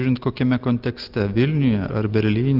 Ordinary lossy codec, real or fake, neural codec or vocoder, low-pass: Opus, 24 kbps; real; none; 5.4 kHz